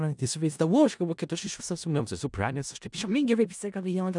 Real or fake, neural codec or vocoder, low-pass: fake; codec, 16 kHz in and 24 kHz out, 0.4 kbps, LongCat-Audio-Codec, four codebook decoder; 10.8 kHz